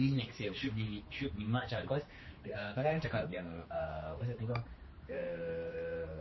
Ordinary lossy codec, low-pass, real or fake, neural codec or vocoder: MP3, 24 kbps; 7.2 kHz; fake; codec, 16 kHz, 2 kbps, X-Codec, HuBERT features, trained on general audio